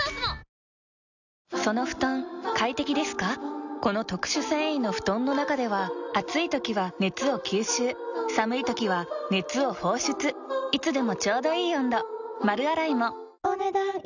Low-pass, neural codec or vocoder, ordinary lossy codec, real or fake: 7.2 kHz; none; none; real